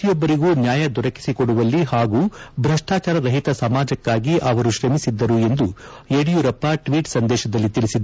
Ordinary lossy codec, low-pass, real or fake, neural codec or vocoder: none; none; real; none